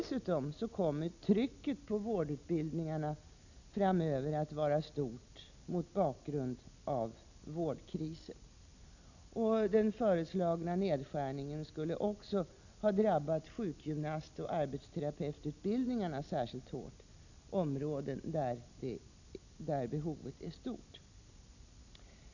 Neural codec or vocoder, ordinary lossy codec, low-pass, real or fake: none; none; 7.2 kHz; real